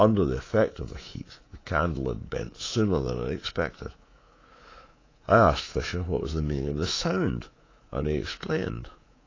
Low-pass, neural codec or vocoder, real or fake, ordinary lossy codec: 7.2 kHz; codec, 24 kHz, 3.1 kbps, DualCodec; fake; AAC, 32 kbps